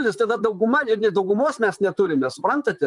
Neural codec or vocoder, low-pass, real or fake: vocoder, 44.1 kHz, 128 mel bands, Pupu-Vocoder; 10.8 kHz; fake